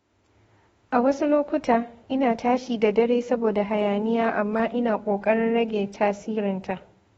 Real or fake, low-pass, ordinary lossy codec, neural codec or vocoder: fake; 19.8 kHz; AAC, 24 kbps; autoencoder, 48 kHz, 32 numbers a frame, DAC-VAE, trained on Japanese speech